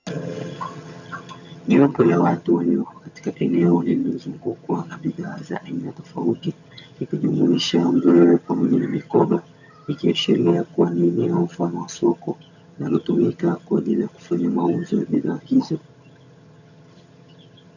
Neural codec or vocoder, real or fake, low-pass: vocoder, 22.05 kHz, 80 mel bands, HiFi-GAN; fake; 7.2 kHz